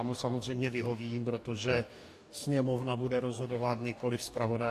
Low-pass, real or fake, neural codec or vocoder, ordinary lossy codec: 14.4 kHz; fake; codec, 44.1 kHz, 2.6 kbps, DAC; AAC, 64 kbps